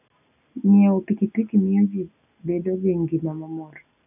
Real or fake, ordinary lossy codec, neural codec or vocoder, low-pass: real; none; none; 3.6 kHz